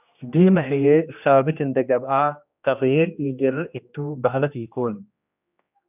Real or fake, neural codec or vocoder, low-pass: fake; codec, 16 kHz, 1 kbps, X-Codec, HuBERT features, trained on general audio; 3.6 kHz